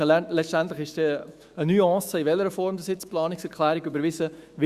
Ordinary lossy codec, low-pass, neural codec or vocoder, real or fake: Opus, 64 kbps; 14.4 kHz; autoencoder, 48 kHz, 128 numbers a frame, DAC-VAE, trained on Japanese speech; fake